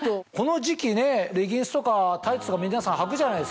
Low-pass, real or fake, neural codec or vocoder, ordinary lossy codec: none; real; none; none